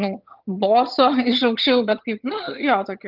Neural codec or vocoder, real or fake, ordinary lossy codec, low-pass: vocoder, 22.05 kHz, 80 mel bands, HiFi-GAN; fake; Opus, 32 kbps; 5.4 kHz